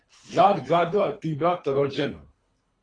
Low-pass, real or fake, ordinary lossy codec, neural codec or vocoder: 9.9 kHz; fake; AAC, 32 kbps; codec, 24 kHz, 3 kbps, HILCodec